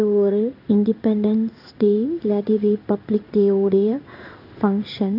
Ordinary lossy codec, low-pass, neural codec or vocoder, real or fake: none; 5.4 kHz; codec, 16 kHz in and 24 kHz out, 1 kbps, XY-Tokenizer; fake